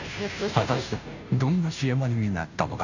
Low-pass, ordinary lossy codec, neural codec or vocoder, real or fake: 7.2 kHz; none; codec, 16 kHz, 0.5 kbps, FunCodec, trained on Chinese and English, 25 frames a second; fake